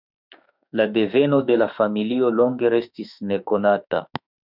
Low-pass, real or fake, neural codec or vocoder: 5.4 kHz; fake; autoencoder, 48 kHz, 32 numbers a frame, DAC-VAE, trained on Japanese speech